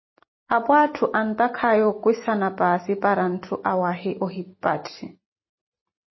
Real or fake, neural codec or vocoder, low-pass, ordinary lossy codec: real; none; 7.2 kHz; MP3, 24 kbps